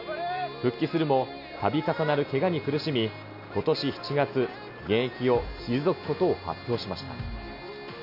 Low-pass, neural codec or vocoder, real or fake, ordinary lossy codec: 5.4 kHz; none; real; none